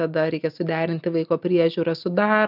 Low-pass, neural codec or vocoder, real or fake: 5.4 kHz; none; real